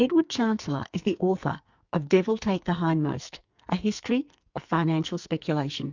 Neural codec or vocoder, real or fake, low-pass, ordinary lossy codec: codec, 44.1 kHz, 2.6 kbps, SNAC; fake; 7.2 kHz; Opus, 64 kbps